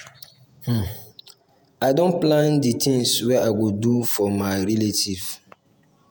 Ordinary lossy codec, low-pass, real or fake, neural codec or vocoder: none; none; fake; vocoder, 48 kHz, 128 mel bands, Vocos